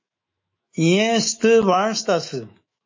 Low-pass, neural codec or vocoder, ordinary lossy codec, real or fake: 7.2 kHz; autoencoder, 48 kHz, 128 numbers a frame, DAC-VAE, trained on Japanese speech; MP3, 32 kbps; fake